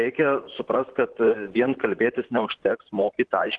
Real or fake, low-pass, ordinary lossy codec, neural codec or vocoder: fake; 10.8 kHz; Opus, 16 kbps; vocoder, 44.1 kHz, 128 mel bands, Pupu-Vocoder